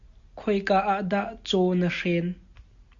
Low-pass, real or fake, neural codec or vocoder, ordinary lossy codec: 7.2 kHz; real; none; Opus, 64 kbps